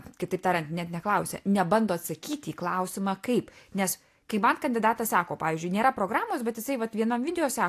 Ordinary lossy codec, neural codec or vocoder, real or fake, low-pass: AAC, 64 kbps; none; real; 14.4 kHz